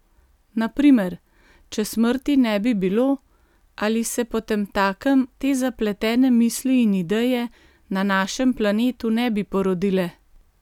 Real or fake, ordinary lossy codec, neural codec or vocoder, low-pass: real; none; none; 19.8 kHz